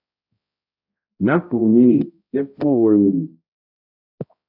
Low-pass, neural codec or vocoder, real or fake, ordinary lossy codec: 5.4 kHz; codec, 16 kHz, 0.5 kbps, X-Codec, HuBERT features, trained on balanced general audio; fake; AAC, 48 kbps